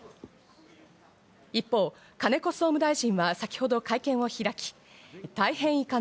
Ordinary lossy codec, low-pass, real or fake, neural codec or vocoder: none; none; real; none